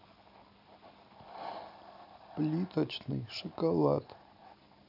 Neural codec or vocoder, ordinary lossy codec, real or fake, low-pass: none; AAC, 48 kbps; real; 5.4 kHz